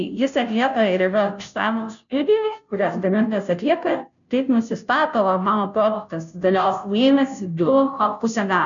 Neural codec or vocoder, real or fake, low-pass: codec, 16 kHz, 0.5 kbps, FunCodec, trained on Chinese and English, 25 frames a second; fake; 7.2 kHz